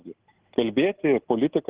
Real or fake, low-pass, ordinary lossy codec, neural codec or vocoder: real; 3.6 kHz; Opus, 16 kbps; none